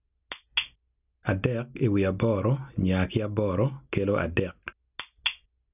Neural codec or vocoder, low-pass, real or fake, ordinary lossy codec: none; 3.6 kHz; real; none